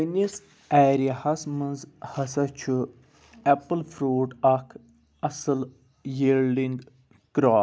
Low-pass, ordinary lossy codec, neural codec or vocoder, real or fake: none; none; none; real